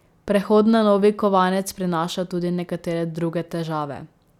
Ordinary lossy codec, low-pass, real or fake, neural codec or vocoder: none; 19.8 kHz; real; none